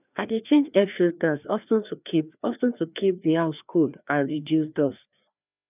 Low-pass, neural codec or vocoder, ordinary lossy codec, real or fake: 3.6 kHz; codec, 16 kHz, 2 kbps, FreqCodec, larger model; none; fake